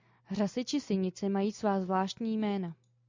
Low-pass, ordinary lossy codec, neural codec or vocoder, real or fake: 7.2 kHz; MP3, 64 kbps; none; real